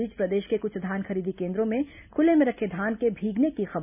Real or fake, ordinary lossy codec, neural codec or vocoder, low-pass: real; none; none; 3.6 kHz